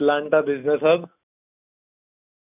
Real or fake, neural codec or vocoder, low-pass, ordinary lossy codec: real; none; 3.6 kHz; none